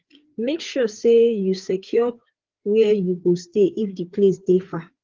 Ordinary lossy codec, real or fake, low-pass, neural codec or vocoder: Opus, 16 kbps; fake; 7.2 kHz; codec, 16 kHz, 4 kbps, FreqCodec, larger model